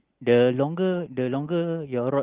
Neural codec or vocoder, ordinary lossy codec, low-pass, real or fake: none; Opus, 16 kbps; 3.6 kHz; real